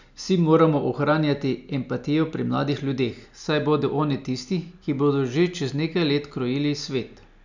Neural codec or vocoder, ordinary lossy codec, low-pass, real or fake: none; none; 7.2 kHz; real